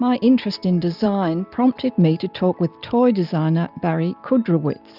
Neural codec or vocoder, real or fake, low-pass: none; real; 5.4 kHz